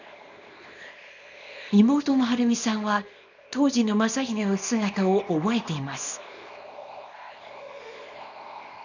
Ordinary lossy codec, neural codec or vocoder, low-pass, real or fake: none; codec, 24 kHz, 0.9 kbps, WavTokenizer, small release; 7.2 kHz; fake